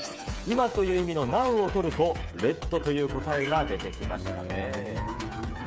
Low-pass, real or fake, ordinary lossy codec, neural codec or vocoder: none; fake; none; codec, 16 kHz, 8 kbps, FreqCodec, smaller model